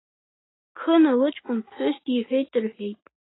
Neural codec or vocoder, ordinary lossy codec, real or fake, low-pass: none; AAC, 16 kbps; real; 7.2 kHz